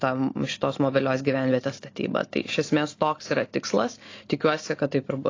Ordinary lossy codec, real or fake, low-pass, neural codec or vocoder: AAC, 32 kbps; real; 7.2 kHz; none